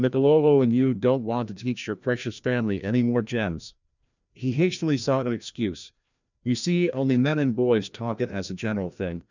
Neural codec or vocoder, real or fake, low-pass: codec, 16 kHz, 1 kbps, FreqCodec, larger model; fake; 7.2 kHz